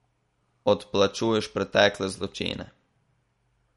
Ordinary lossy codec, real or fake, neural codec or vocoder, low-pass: MP3, 48 kbps; real; none; 19.8 kHz